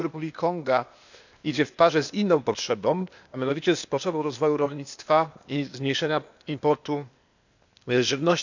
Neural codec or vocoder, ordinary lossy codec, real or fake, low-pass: codec, 16 kHz, 0.8 kbps, ZipCodec; none; fake; 7.2 kHz